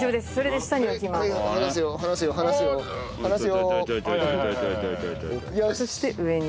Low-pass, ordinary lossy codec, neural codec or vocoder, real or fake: none; none; none; real